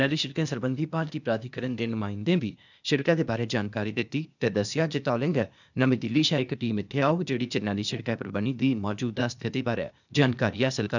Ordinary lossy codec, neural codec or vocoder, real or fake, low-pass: none; codec, 16 kHz, 0.8 kbps, ZipCodec; fake; 7.2 kHz